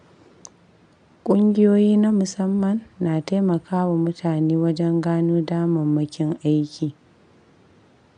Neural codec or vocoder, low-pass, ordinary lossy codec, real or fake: none; 9.9 kHz; none; real